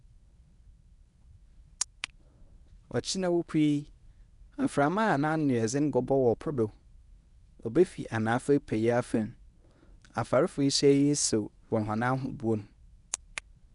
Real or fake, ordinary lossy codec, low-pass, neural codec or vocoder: fake; none; 10.8 kHz; codec, 24 kHz, 0.9 kbps, WavTokenizer, medium speech release version 1